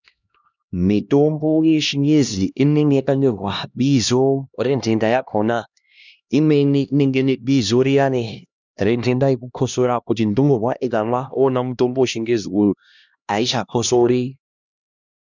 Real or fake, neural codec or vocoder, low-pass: fake; codec, 16 kHz, 1 kbps, X-Codec, HuBERT features, trained on LibriSpeech; 7.2 kHz